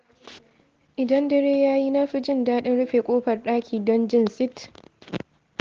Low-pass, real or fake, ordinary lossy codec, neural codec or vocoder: 7.2 kHz; real; Opus, 16 kbps; none